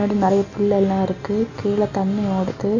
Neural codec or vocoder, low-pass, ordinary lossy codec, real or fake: none; 7.2 kHz; none; real